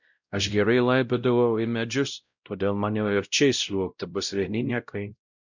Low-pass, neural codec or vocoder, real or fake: 7.2 kHz; codec, 16 kHz, 0.5 kbps, X-Codec, WavLM features, trained on Multilingual LibriSpeech; fake